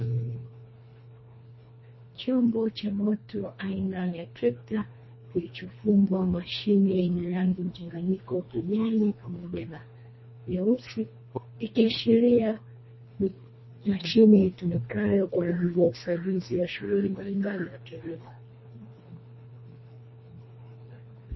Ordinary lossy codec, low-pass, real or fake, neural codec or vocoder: MP3, 24 kbps; 7.2 kHz; fake; codec, 24 kHz, 1.5 kbps, HILCodec